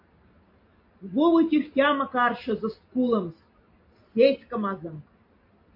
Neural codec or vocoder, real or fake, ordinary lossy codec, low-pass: none; real; MP3, 32 kbps; 5.4 kHz